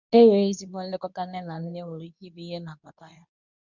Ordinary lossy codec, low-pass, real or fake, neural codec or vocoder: none; 7.2 kHz; fake; codec, 24 kHz, 0.9 kbps, WavTokenizer, medium speech release version 2